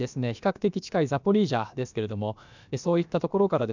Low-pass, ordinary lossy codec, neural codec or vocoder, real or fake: 7.2 kHz; none; codec, 16 kHz, about 1 kbps, DyCAST, with the encoder's durations; fake